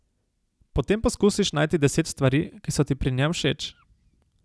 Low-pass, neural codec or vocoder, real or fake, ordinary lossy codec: none; none; real; none